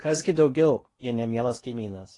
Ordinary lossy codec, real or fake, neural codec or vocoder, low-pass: AAC, 32 kbps; fake; codec, 16 kHz in and 24 kHz out, 0.8 kbps, FocalCodec, streaming, 65536 codes; 10.8 kHz